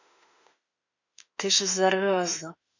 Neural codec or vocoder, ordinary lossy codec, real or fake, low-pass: autoencoder, 48 kHz, 32 numbers a frame, DAC-VAE, trained on Japanese speech; none; fake; 7.2 kHz